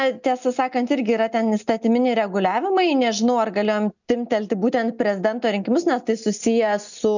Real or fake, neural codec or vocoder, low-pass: real; none; 7.2 kHz